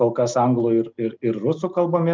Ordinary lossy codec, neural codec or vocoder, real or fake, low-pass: Opus, 32 kbps; none; real; 7.2 kHz